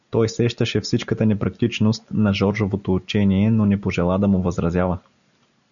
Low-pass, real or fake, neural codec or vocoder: 7.2 kHz; real; none